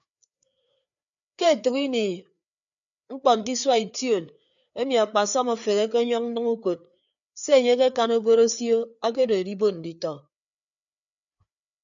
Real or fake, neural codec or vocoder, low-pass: fake; codec, 16 kHz, 4 kbps, FreqCodec, larger model; 7.2 kHz